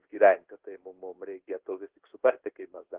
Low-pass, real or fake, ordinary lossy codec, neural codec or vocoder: 3.6 kHz; fake; Opus, 16 kbps; codec, 16 kHz in and 24 kHz out, 1 kbps, XY-Tokenizer